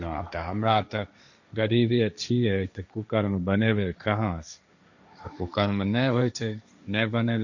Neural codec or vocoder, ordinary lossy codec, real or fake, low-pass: codec, 16 kHz, 1.1 kbps, Voila-Tokenizer; none; fake; 7.2 kHz